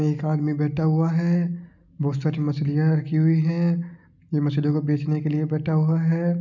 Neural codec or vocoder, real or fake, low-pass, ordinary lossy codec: none; real; 7.2 kHz; none